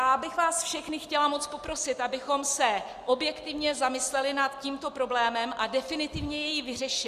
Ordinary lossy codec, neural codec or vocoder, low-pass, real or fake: Opus, 64 kbps; none; 14.4 kHz; real